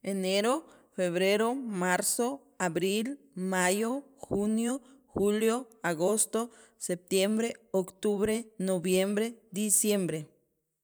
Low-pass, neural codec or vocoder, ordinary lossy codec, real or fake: none; vocoder, 44.1 kHz, 128 mel bands every 512 samples, BigVGAN v2; none; fake